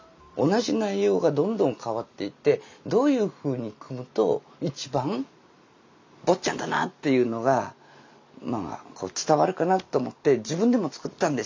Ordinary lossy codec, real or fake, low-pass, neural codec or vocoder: MP3, 64 kbps; real; 7.2 kHz; none